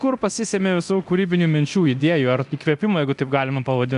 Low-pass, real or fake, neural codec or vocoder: 10.8 kHz; fake; codec, 24 kHz, 0.9 kbps, DualCodec